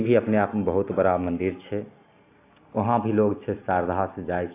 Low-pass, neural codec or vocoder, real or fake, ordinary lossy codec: 3.6 kHz; none; real; AAC, 24 kbps